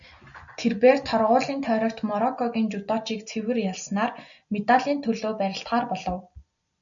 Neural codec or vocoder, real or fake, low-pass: none; real; 7.2 kHz